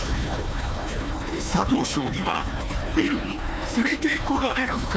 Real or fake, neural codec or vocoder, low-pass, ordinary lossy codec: fake; codec, 16 kHz, 1 kbps, FunCodec, trained on Chinese and English, 50 frames a second; none; none